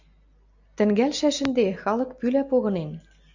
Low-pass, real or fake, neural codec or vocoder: 7.2 kHz; real; none